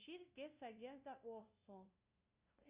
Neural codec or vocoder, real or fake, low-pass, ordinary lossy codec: codec, 16 kHz, 0.5 kbps, FunCodec, trained on LibriTTS, 25 frames a second; fake; 3.6 kHz; Opus, 64 kbps